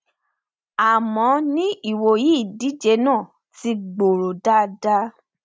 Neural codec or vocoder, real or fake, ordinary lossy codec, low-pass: none; real; none; none